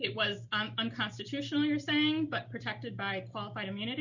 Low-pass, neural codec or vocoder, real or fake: 7.2 kHz; none; real